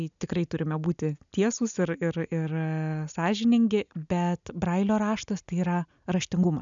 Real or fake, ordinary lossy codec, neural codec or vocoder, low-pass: real; MP3, 96 kbps; none; 7.2 kHz